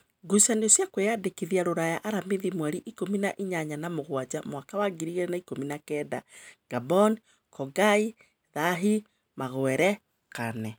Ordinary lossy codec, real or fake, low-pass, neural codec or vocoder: none; real; none; none